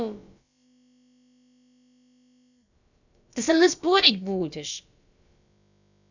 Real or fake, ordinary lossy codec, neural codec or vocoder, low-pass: fake; none; codec, 16 kHz, about 1 kbps, DyCAST, with the encoder's durations; 7.2 kHz